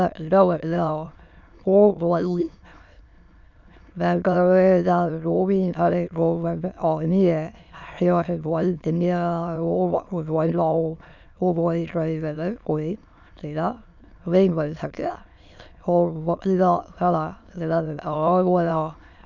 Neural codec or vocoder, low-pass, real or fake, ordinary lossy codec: autoencoder, 22.05 kHz, a latent of 192 numbers a frame, VITS, trained on many speakers; 7.2 kHz; fake; none